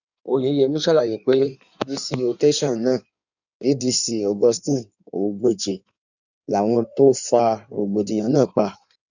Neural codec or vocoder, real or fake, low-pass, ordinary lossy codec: codec, 16 kHz in and 24 kHz out, 1.1 kbps, FireRedTTS-2 codec; fake; 7.2 kHz; none